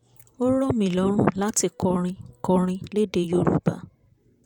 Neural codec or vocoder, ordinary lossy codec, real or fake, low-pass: vocoder, 44.1 kHz, 128 mel bands, Pupu-Vocoder; none; fake; 19.8 kHz